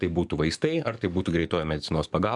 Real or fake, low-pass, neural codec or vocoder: fake; 10.8 kHz; codec, 44.1 kHz, 7.8 kbps, DAC